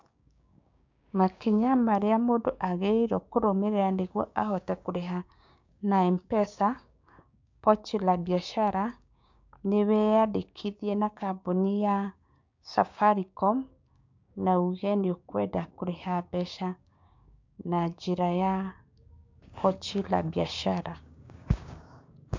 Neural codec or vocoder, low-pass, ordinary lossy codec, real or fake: codec, 16 kHz, 6 kbps, DAC; 7.2 kHz; MP3, 64 kbps; fake